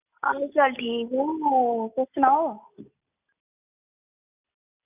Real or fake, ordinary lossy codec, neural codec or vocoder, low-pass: real; none; none; 3.6 kHz